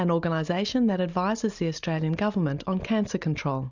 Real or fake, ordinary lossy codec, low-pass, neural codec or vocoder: real; Opus, 64 kbps; 7.2 kHz; none